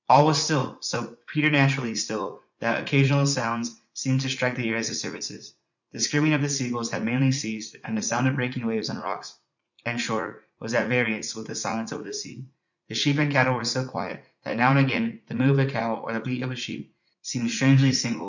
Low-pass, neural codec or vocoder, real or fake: 7.2 kHz; vocoder, 44.1 kHz, 80 mel bands, Vocos; fake